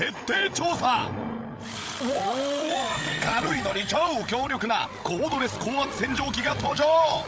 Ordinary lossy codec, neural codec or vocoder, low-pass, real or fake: none; codec, 16 kHz, 8 kbps, FreqCodec, larger model; none; fake